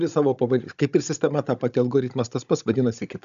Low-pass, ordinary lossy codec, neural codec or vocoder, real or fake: 7.2 kHz; MP3, 96 kbps; codec, 16 kHz, 16 kbps, FunCodec, trained on Chinese and English, 50 frames a second; fake